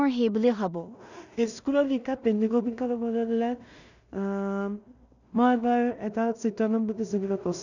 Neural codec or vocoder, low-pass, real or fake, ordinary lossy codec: codec, 16 kHz in and 24 kHz out, 0.4 kbps, LongCat-Audio-Codec, two codebook decoder; 7.2 kHz; fake; none